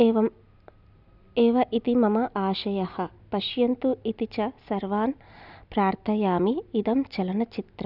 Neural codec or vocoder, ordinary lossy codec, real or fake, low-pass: none; none; real; 5.4 kHz